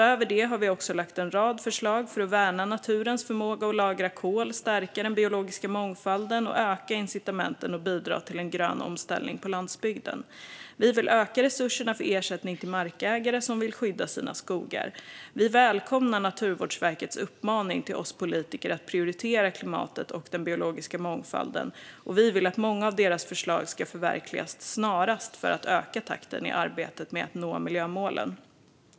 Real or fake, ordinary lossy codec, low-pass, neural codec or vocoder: real; none; none; none